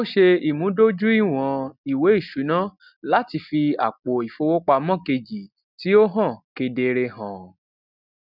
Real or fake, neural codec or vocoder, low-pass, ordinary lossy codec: real; none; 5.4 kHz; none